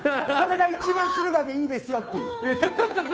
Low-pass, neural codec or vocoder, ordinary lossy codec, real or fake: none; codec, 16 kHz, 2 kbps, FunCodec, trained on Chinese and English, 25 frames a second; none; fake